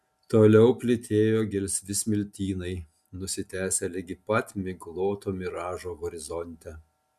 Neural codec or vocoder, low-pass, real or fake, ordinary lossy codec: none; 14.4 kHz; real; MP3, 96 kbps